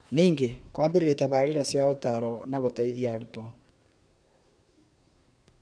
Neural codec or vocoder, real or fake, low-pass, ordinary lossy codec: codec, 24 kHz, 1 kbps, SNAC; fake; 9.9 kHz; none